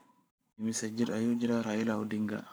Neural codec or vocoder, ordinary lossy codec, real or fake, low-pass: codec, 44.1 kHz, 7.8 kbps, Pupu-Codec; none; fake; none